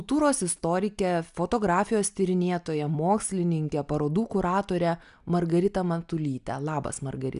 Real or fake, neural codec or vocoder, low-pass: real; none; 10.8 kHz